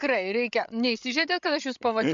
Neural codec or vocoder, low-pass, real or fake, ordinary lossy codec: codec, 16 kHz, 16 kbps, FreqCodec, larger model; 7.2 kHz; fake; MP3, 64 kbps